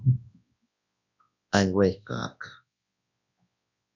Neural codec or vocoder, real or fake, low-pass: codec, 24 kHz, 0.9 kbps, WavTokenizer, large speech release; fake; 7.2 kHz